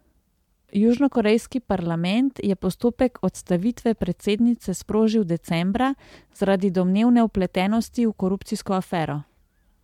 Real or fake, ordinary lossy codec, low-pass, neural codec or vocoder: real; MP3, 96 kbps; 19.8 kHz; none